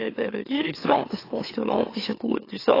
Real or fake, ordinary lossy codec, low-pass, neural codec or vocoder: fake; AAC, 24 kbps; 5.4 kHz; autoencoder, 44.1 kHz, a latent of 192 numbers a frame, MeloTTS